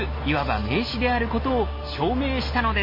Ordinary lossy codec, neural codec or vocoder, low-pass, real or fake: MP3, 24 kbps; none; 5.4 kHz; real